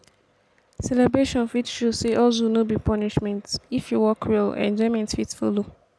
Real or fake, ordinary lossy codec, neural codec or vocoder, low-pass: real; none; none; none